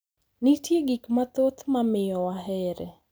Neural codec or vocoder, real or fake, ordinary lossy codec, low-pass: none; real; none; none